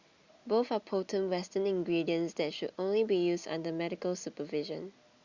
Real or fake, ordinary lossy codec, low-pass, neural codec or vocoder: real; Opus, 64 kbps; 7.2 kHz; none